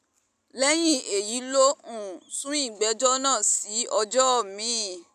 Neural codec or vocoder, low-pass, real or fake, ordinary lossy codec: none; 14.4 kHz; real; none